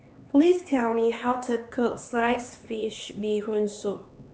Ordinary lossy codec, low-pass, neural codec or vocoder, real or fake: none; none; codec, 16 kHz, 2 kbps, X-Codec, HuBERT features, trained on LibriSpeech; fake